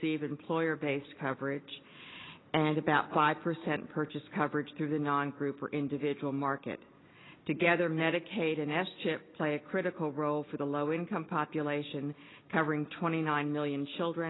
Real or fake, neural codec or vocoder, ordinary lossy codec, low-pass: real; none; AAC, 16 kbps; 7.2 kHz